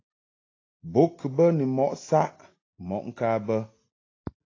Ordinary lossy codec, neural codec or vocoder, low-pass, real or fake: AAC, 32 kbps; none; 7.2 kHz; real